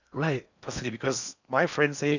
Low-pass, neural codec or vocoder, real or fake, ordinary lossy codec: 7.2 kHz; codec, 16 kHz in and 24 kHz out, 0.8 kbps, FocalCodec, streaming, 65536 codes; fake; none